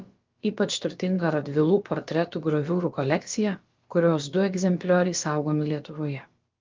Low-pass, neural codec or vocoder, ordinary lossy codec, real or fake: 7.2 kHz; codec, 16 kHz, about 1 kbps, DyCAST, with the encoder's durations; Opus, 32 kbps; fake